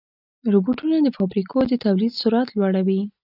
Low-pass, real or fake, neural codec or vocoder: 5.4 kHz; real; none